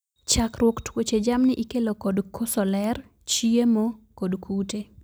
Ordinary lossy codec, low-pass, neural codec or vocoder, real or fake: none; none; none; real